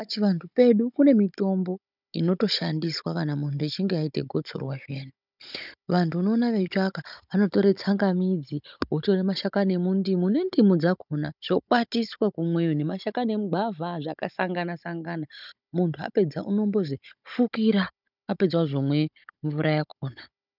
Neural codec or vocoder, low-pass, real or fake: codec, 16 kHz, 16 kbps, FunCodec, trained on Chinese and English, 50 frames a second; 5.4 kHz; fake